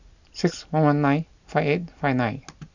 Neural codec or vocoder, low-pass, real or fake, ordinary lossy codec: none; 7.2 kHz; real; none